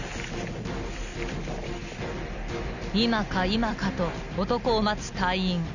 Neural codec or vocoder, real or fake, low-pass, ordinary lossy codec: none; real; 7.2 kHz; none